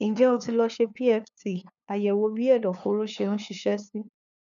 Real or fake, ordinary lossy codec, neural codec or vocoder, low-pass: fake; none; codec, 16 kHz, 2 kbps, FunCodec, trained on LibriTTS, 25 frames a second; 7.2 kHz